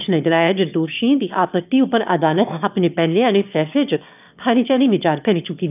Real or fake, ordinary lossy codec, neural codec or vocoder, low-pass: fake; none; autoencoder, 22.05 kHz, a latent of 192 numbers a frame, VITS, trained on one speaker; 3.6 kHz